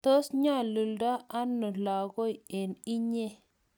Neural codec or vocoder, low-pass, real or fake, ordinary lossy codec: none; none; real; none